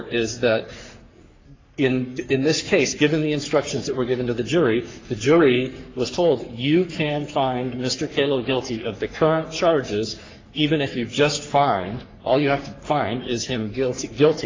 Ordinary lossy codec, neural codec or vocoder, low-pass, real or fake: AAC, 32 kbps; codec, 44.1 kHz, 3.4 kbps, Pupu-Codec; 7.2 kHz; fake